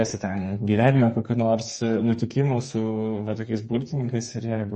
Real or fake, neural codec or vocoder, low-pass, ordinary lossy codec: fake; codec, 32 kHz, 1.9 kbps, SNAC; 10.8 kHz; MP3, 32 kbps